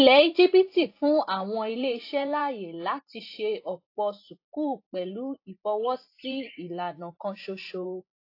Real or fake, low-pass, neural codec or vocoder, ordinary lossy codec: fake; 5.4 kHz; vocoder, 44.1 kHz, 128 mel bands every 512 samples, BigVGAN v2; AAC, 32 kbps